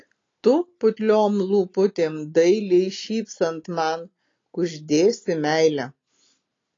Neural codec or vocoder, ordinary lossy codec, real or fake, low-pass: none; AAC, 32 kbps; real; 7.2 kHz